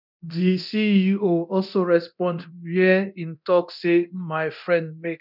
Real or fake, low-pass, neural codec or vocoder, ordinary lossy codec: fake; 5.4 kHz; codec, 24 kHz, 0.9 kbps, DualCodec; none